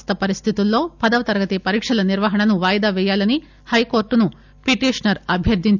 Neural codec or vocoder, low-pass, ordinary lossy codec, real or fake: none; 7.2 kHz; none; real